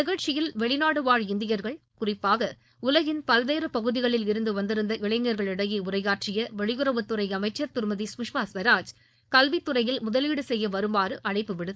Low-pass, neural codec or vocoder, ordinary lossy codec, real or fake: none; codec, 16 kHz, 4.8 kbps, FACodec; none; fake